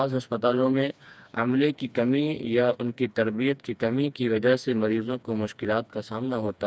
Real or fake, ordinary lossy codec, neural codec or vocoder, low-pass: fake; none; codec, 16 kHz, 2 kbps, FreqCodec, smaller model; none